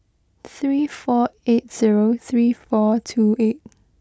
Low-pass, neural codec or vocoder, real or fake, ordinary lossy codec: none; none; real; none